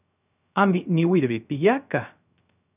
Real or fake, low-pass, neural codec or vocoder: fake; 3.6 kHz; codec, 16 kHz, 0.3 kbps, FocalCodec